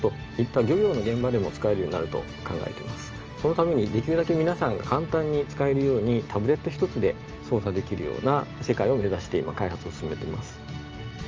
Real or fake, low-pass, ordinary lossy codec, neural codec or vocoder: real; 7.2 kHz; Opus, 24 kbps; none